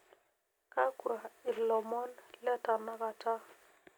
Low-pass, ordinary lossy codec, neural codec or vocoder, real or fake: none; none; none; real